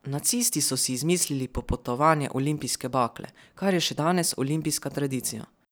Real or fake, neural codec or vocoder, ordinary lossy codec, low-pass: fake; vocoder, 44.1 kHz, 128 mel bands every 512 samples, BigVGAN v2; none; none